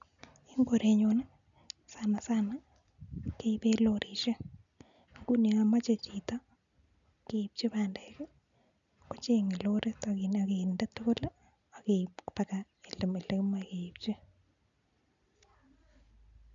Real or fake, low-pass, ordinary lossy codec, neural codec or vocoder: real; 7.2 kHz; none; none